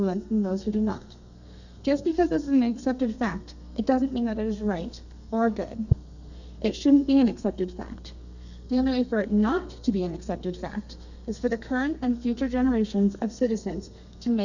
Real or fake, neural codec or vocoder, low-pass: fake; codec, 32 kHz, 1.9 kbps, SNAC; 7.2 kHz